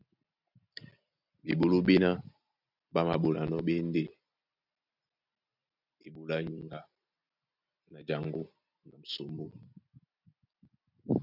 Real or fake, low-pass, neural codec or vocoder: real; 5.4 kHz; none